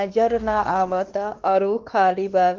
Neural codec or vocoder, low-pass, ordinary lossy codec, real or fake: codec, 16 kHz, 2 kbps, X-Codec, WavLM features, trained on Multilingual LibriSpeech; 7.2 kHz; Opus, 16 kbps; fake